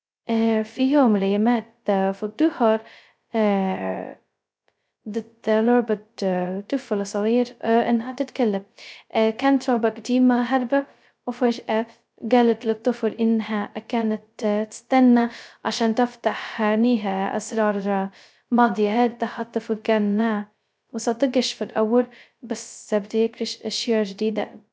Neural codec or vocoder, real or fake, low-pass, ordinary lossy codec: codec, 16 kHz, 0.2 kbps, FocalCodec; fake; none; none